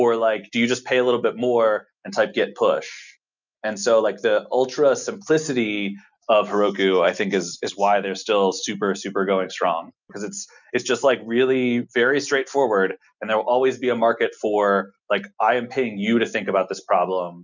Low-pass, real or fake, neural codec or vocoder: 7.2 kHz; real; none